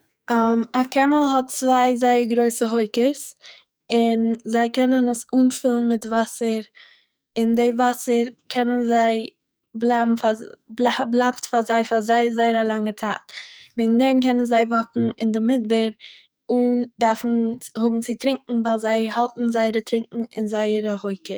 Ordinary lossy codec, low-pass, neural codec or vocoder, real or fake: none; none; codec, 44.1 kHz, 2.6 kbps, SNAC; fake